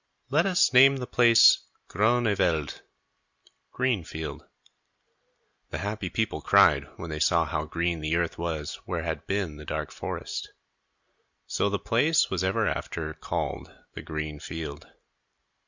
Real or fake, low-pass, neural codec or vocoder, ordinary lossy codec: real; 7.2 kHz; none; Opus, 64 kbps